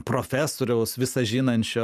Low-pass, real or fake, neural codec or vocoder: 14.4 kHz; fake; vocoder, 44.1 kHz, 128 mel bands every 512 samples, BigVGAN v2